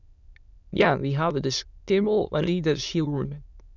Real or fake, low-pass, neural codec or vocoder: fake; 7.2 kHz; autoencoder, 22.05 kHz, a latent of 192 numbers a frame, VITS, trained on many speakers